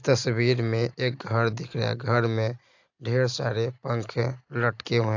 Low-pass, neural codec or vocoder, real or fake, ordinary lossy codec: 7.2 kHz; none; real; AAC, 48 kbps